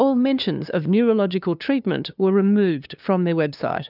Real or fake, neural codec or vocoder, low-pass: fake; codec, 16 kHz, 2 kbps, FunCodec, trained on LibriTTS, 25 frames a second; 5.4 kHz